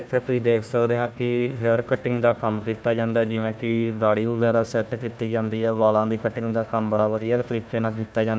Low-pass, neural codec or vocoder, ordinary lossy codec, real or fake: none; codec, 16 kHz, 1 kbps, FunCodec, trained on Chinese and English, 50 frames a second; none; fake